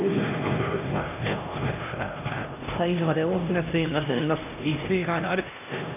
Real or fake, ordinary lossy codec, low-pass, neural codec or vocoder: fake; none; 3.6 kHz; codec, 16 kHz, 1 kbps, X-Codec, HuBERT features, trained on LibriSpeech